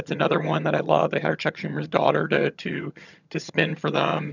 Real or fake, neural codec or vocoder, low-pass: fake; vocoder, 22.05 kHz, 80 mel bands, HiFi-GAN; 7.2 kHz